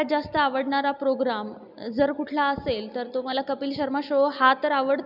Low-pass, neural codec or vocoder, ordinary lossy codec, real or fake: 5.4 kHz; none; none; real